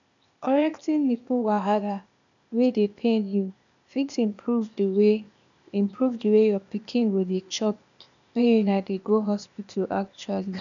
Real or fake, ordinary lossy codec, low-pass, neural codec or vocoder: fake; none; 7.2 kHz; codec, 16 kHz, 0.8 kbps, ZipCodec